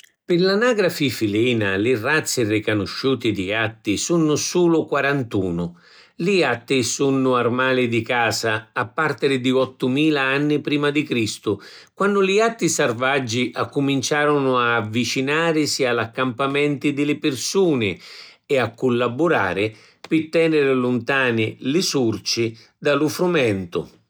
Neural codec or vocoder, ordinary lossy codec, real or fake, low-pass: none; none; real; none